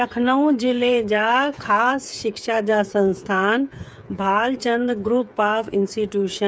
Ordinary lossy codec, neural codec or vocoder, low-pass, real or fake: none; codec, 16 kHz, 8 kbps, FreqCodec, smaller model; none; fake